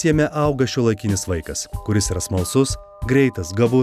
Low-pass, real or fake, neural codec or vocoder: 14.4 kHz; real; none